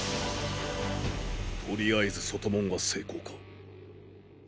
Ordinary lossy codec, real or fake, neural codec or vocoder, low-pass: none; real; none; none